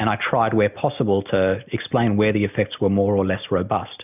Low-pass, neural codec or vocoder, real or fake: 3.6 kHz; none; real